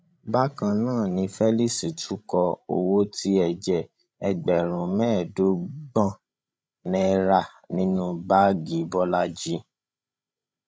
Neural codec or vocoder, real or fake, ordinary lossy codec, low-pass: codec, 16 kHz, 16 kbps, FreqCodec, larger model; fake; none; none